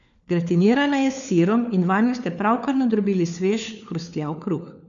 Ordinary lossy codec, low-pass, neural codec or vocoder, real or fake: none; 7.2 kHz; codec, 16 kHz, 4 kbps, FunCodec, trained on LibriTTS, 50 frames a second; fake